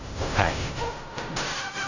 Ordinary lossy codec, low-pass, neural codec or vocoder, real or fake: none; 7.2 kHz; codec, 16 kHz in and 24 kHz out, 0.4 kbps, LongCat-Audio-Codec, fine tuned four codebook decoder; fake